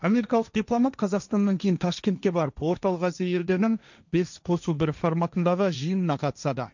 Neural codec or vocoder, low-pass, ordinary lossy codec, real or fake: codec, 16 kHz, 1.1 kbps, Voila-Tokenizer; 7.2 kHz; none; fake